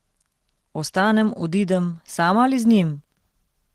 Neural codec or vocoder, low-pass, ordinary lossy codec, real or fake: none; 14.4 kHz; Opus, 16 kbps; real